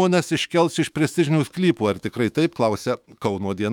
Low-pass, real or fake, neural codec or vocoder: 19.8 kHz; fake; autoencoder, 48 kHz, 128 numbers a frame, DAC-VAE, trained on Japanese speech